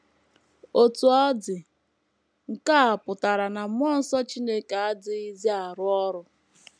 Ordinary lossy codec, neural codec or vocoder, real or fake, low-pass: none; none; real; none